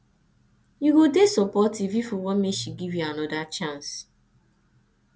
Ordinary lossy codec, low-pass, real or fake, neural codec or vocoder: none; none; real; none